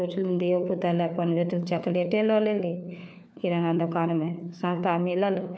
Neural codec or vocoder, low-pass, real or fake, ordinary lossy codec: codec, 16 kHz, 4 kbps, FunCodec, trained on LibriTTS, 50 frames a second; none; fake; none